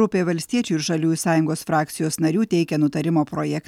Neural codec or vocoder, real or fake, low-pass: none; real; 19.8 kHz